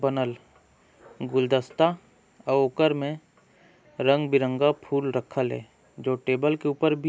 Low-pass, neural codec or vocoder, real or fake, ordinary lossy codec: none; none; real; none